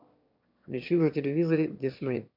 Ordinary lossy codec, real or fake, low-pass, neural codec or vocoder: MP3, 48 kbps; fake; 5.4 kHz; autoencoder, 22.05 kHz, a latent of 192 numbers a frame, VITS, trained on one speaker